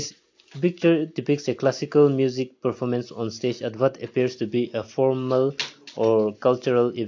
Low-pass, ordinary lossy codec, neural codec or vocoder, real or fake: 7.2 kHz; AAC, 48 kbps; none; real